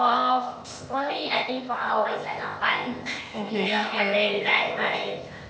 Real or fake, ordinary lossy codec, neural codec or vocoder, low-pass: fake; none; codec, 16 kHz, 0.8 kbps, ZipCodec; none